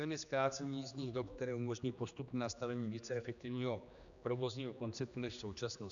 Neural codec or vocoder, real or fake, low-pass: codec, 16 kHz, 2 kbps, X-Codec, HuBERT features, trained on general audio; fake; 7.2 kHz